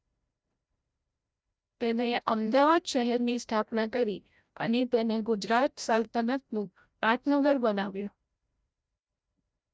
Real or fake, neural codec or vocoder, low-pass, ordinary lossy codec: fake; codec, 16 kHz, 0.5 kbps, FreqCodec, larger model; none; none